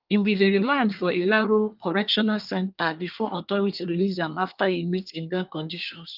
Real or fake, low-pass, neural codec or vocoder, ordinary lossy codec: fake; 5.4 kHz; codec, 24 kHz, 1 kbps, SNAC; Opus, 24 kbps